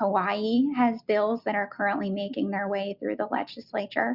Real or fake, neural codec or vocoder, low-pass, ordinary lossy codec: real; none; 5.4 kHz; Opus, 64 kbps